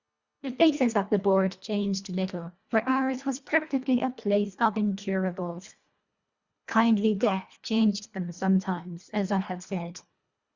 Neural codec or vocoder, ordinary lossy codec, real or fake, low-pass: codec, 24 kHz, 1.5 kbps, HILCodec; Opus, 64 kbps; fake; 7.2 kHz